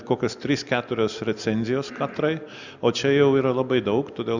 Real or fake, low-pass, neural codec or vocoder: real; 7.2 kHz; none